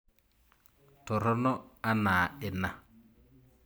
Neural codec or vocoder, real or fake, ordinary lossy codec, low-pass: none; real; none; none